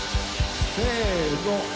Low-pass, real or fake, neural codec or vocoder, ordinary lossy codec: none; real; none; none